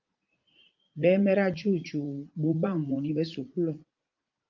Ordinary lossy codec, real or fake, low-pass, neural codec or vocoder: Opus, 24 kbps; fake; 7.2 kHz; vocoder, 44.1 kHz, 80 mel bands, Vocos